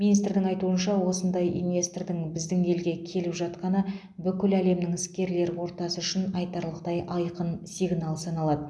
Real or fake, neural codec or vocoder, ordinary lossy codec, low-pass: real; none; none; none